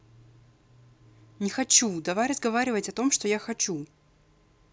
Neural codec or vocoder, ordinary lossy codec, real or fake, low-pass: none; none; real; none